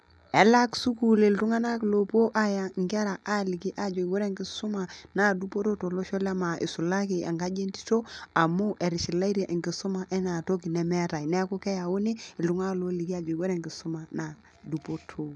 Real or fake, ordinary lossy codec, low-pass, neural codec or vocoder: real; none; none; none